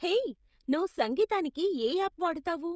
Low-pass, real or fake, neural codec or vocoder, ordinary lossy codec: none; fake; codec, 16 kHz, 16 kbps, FreqCodec, smaller model; none